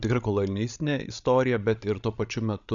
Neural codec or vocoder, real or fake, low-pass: codec, 16 kHz, 16 kbps, FreqCodec, larger model; fake; 7.2 kHz